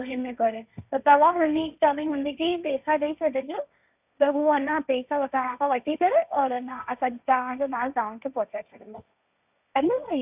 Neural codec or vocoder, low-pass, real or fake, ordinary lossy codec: codec, 16 kHz, 1.1 kbps, Voila-Tokenizer; 3.6 kHz; fake; none